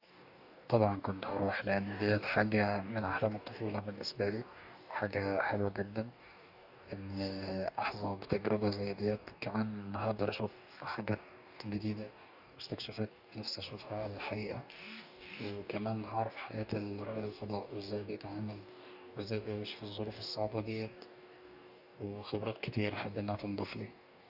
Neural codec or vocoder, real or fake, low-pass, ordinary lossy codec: codec, 44.1 kHz, 2.6 kbps, DAC; fake; 5.4 kHz; none